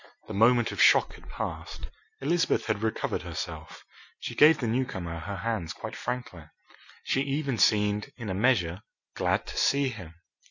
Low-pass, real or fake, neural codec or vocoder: 7.2 kHz; real; none